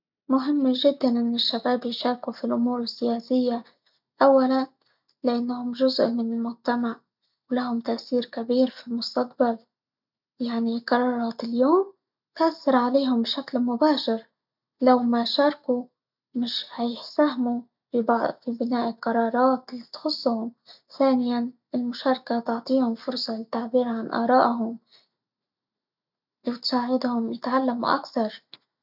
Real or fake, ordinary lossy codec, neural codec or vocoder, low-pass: real; none; none; 5.4 kHz